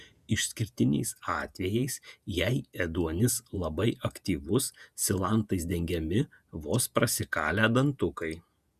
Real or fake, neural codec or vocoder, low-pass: fake; vocoder, 48 kHz, 128 mel bands, Vocos; 14.4 kHz